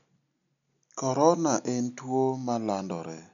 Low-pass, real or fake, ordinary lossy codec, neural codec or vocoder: 7.2 kHz; real; none; none